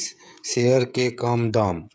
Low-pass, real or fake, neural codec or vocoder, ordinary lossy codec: none; fake; codec, 16 kHz, 16 kbps, FreqCodec, smaller model; none